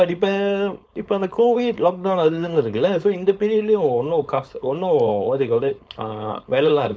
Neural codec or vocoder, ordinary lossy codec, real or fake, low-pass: codec, 16 kHz, 4.8 kbps, FACodec; none; fake; none